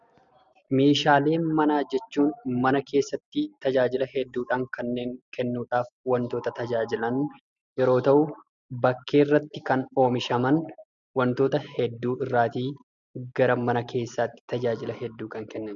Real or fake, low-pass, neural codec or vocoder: real; 7.2 kHz; none